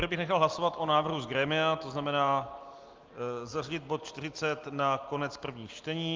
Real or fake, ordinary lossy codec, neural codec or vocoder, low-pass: real; Opus, 32 kbps; none; 7.2 kHz